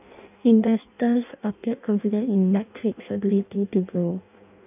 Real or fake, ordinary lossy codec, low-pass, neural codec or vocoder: fake; none; 3.6 kHz; codec, 16 kHz in and 24 kHz out, 0.6 kbps, FireRedTTS-2 codec